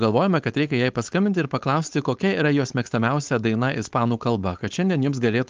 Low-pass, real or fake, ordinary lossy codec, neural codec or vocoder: 7.2 kHz; fake; Opus, 32 kbps; codec, 16 kHz, 4.8 kbps, FACodec